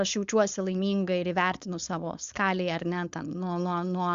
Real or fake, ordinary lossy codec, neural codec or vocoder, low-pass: fake; Opus, 64 kbps; codec, 16 kHz, 4.8 kbps, FACodec; 7.2 kHz